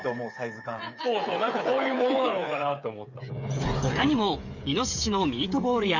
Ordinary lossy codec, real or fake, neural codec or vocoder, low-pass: none; fake; codec, 16 kHz, 8 kbps, FreqCodec, smaller model; 7.2 kHz